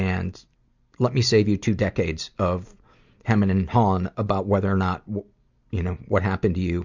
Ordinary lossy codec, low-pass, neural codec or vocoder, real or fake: Opus, 64 kbps; 7.2 kHz; none; real